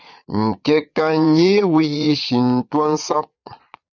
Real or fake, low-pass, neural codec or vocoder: fake; 7.2 kHz; vocoder, 22.05 kHz, 80 mel bands, Vocos